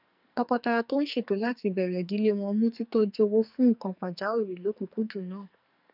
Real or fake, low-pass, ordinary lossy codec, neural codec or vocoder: fake; 5.4 kHz; none; codec, 44.1 kHz, 2.6 kbps, SNAC